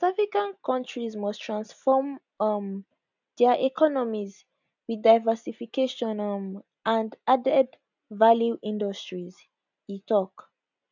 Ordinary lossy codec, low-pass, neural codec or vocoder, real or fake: none; 7.2 kHz; none; real